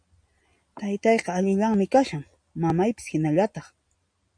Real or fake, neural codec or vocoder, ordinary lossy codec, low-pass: real; none; AAC, 64 kbps; 9.9 kHz